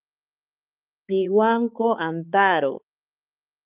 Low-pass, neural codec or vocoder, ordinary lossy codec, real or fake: 3.6 kHz; codec, 16 kHz, 4 kbps, X-Codec, HuBERT features, trained on balanced general audio; Opus, 24 kbps; fake